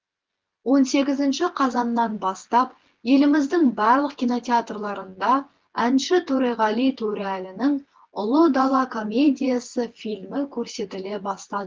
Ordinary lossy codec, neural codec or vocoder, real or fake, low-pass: Opus, 16 kbps; vocoder, 24 kHz, 100 mel bands, Vocos; fake; 7.2 kHz